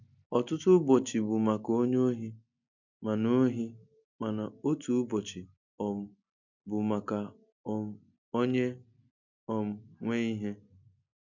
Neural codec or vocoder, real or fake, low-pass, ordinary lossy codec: none; real; 7.2 kHz; none